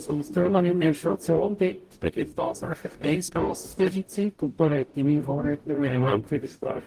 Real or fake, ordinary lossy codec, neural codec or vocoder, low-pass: fake; Opus, 24 kbps; codec, 44.1 kHz, 0.9 kbps, DAC; 14.4 kHz